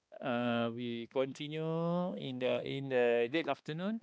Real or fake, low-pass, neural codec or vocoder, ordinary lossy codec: fake; none; codec, 16 kHz, 2 kbps, X-Codec, HuBERT features, trained on balanced general audio; none